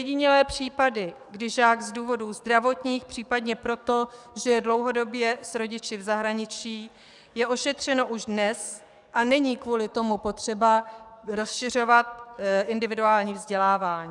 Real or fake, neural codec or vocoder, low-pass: fake; codec, 44.1 kHz, 7.8 kbps, DAC; 10.8 kHz